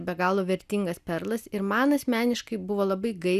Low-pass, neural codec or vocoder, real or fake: 14.4 kHz; none; real